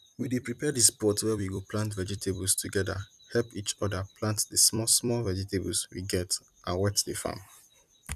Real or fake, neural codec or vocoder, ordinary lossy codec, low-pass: fake; vocoder, 44.1 kHz, 128 mel bands every 256 samples, BigVGAN v2; none; 14.4 kHz